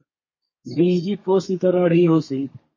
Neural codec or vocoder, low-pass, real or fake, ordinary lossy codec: codec, 32 kHz, 1.9 kbps, SNAC; 7.2 kHz; fake; MP3, 32 kbps